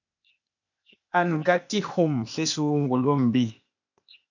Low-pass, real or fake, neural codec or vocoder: 7.2 kHz; fake; codec, 16 kHz, 0.8 kbps, ZipCodec